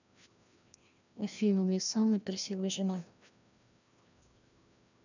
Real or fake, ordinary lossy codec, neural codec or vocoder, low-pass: fake; none; codec, 16 kHz, 1 kbps, FreqCodec, larger model; 7.2 kHz